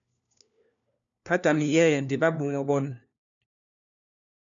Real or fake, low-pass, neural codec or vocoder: fake; 7.2 kHz; codec, 16 kHz, 1 kbps, FunCodec, trained on LibriTTS, 50 frames a second